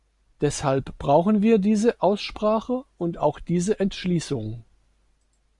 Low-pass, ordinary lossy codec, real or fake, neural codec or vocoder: 10.8 kHz; Opus, 64 kbps; real; none